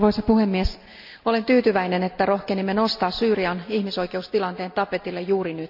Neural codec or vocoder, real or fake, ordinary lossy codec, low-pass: none; real; none; 5.4 kHz